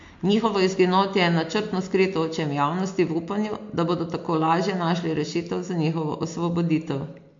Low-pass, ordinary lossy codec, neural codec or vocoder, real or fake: 7.2 kHz; MP3, 48 kbps; none; real